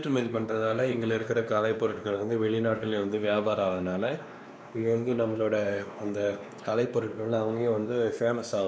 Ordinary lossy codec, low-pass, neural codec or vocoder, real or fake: none; none; codec, 16 kHz, 2 kbps, X-Codec, WavLM features, trained on Multilingual LibriSpeech; fake